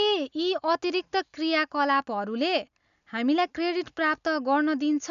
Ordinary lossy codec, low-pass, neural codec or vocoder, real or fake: MP3, 96 kbps; 7.2 kHz; none; real